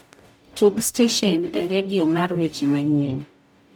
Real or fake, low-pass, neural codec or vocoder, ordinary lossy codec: fake; none; codec, 44.1 kHz, 0.9 kbps, DAC; none